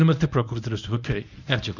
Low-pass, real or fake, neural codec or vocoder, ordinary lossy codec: 7.2 kHz; fake; codec, 24 kHz, 0.9 kbps, WavTokenizer, medium speech release version 1; none